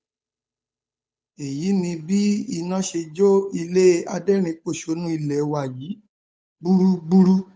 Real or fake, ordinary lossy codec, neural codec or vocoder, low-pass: fake; none; codec, 16 kHz, 8 kbps, FunCodec, trained on Chinese and English, 25 frames a second; none